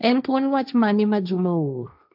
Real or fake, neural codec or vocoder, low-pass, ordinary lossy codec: fake; codec, 16 kHz, 1.1 kbps, Voila-Tokenizer; 5.4 kHz; AAC, 48 kbps